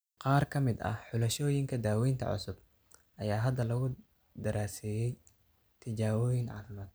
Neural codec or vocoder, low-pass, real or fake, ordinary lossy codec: none; none; real; none